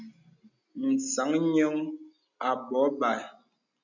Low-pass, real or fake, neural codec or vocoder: 7.2 kHz; real; none